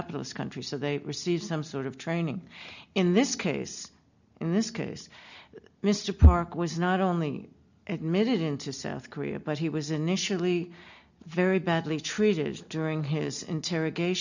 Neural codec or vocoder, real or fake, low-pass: none; real; 7.2 kHz